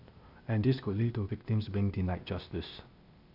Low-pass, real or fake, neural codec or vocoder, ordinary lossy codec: 5.4 kHz; fake; codec, 16 kHz, 0.8 kbps, ZipCodec; none